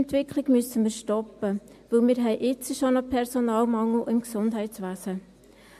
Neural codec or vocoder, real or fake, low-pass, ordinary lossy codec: none; real; 14.4 kHz; MP3, 64 kbps